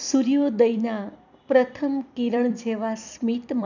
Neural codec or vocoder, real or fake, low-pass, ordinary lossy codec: vocoder, 44.1 kHz, 128 mel bands every 256 samples, BigVGAN v2; fake; 7.2 kHz; none